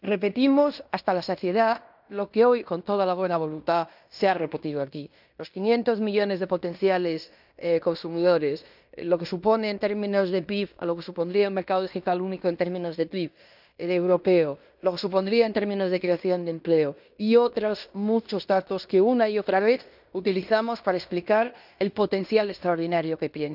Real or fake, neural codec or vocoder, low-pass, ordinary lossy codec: fake; codec, 16 kHz in and 24 kHz out, 0.9 kbps, LongCat-Audio-Codec, fine tuned four codebook decoder; 5.4 kHz; none